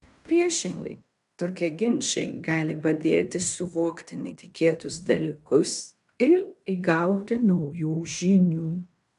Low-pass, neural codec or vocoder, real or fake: 10.8 kHz; codec, 16 kHz in and 24 kHz out, 0.9 kbps, LongCat-Audio-Codec, fine tuned four codebook decoder; fake